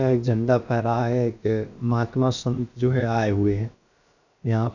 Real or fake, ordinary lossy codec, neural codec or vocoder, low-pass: fake; none; codec, 16 kHz, about 1 kbps, DyCAST, with the encoder's durations; 7.2 kHz